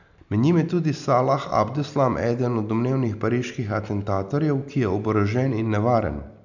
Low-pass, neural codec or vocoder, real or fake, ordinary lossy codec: 7.2 kHz; none; real; none